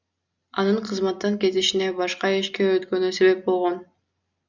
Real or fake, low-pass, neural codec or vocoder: real; 7.2 kHz; none